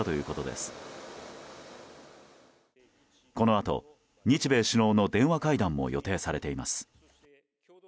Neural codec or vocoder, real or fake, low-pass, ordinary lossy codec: none; real; none; none